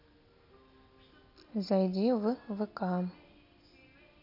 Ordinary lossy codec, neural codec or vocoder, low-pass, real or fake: none; none; 5.4 kHz; real